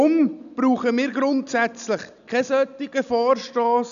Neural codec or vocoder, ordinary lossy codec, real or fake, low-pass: none; none; real; 7.2 kHz